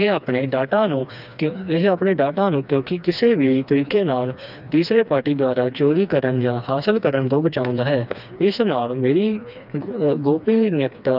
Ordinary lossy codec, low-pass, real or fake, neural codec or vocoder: none; 5.4 kHz; fake; codec, 16 kHz, 2 kbps, FreqCodec, smaller model